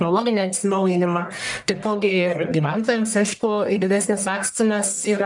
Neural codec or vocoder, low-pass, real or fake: codec, 44.1 kHz, 1.7 kbps, Pupu-Codec; 10.8 kHz; fake